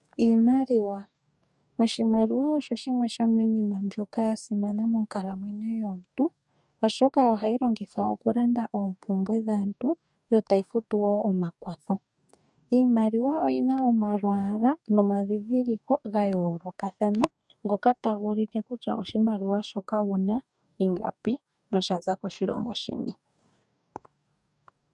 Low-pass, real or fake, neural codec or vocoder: 10.8 kHz; fake; codec, 44.1 kHz, 2.6 kbps, DAC